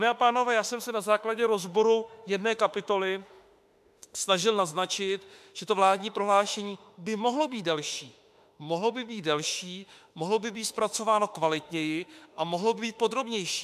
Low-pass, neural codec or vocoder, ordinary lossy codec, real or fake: 14.4 kHz; autoencoder, 48 kHz, 32 numbers a frame, DAC-VAE, trained on Japanese speech; AAC, 96 kbps; fake